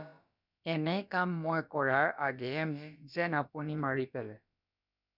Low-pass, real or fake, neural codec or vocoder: 5.4 kHz; fake; codec, 16 kHz, about 1 kbps, DyCAST, with the encoder's durations